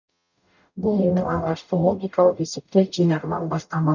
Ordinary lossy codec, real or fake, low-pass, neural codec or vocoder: none; fake; 7.2 kHz; codec, 44.1 kHz, 0.9 kbps, DAC